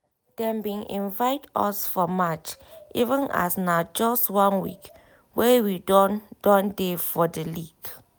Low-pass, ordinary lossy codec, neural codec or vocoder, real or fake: none; none; none; real